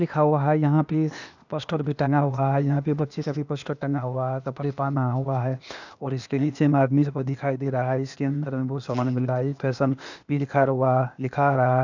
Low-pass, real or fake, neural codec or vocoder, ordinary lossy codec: 7.2 kHz; fake; codec, 16 kHz, 0.8 kbps, ZipCodec; none